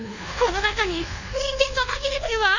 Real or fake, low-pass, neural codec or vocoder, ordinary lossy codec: fake; 7.2 kHz; codec, 24 kHz, 1.2 kbps, DualCodec; none